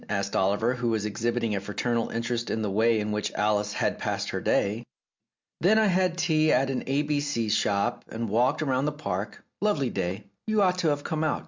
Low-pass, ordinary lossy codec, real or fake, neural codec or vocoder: 7.2 kHz; MP3, 64 kbps; real; none